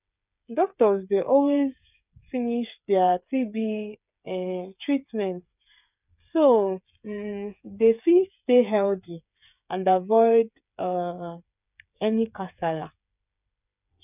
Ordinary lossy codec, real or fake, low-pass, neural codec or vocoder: none; fake; 3.6 kHz; codec, 16 kHz, 8 kbps, FreqCodec, smaller model